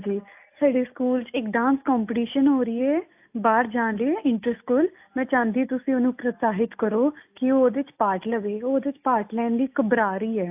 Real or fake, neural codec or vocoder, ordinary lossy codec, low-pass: real; none; AAC, 32 kbps; 3.6 kHz